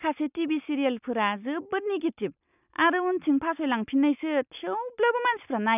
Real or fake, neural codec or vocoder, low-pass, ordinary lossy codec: real; none; 3.6 kHz; none